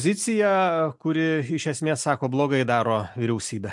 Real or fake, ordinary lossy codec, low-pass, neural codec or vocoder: real; MP3, 64 kbps; 10.8 kHz; none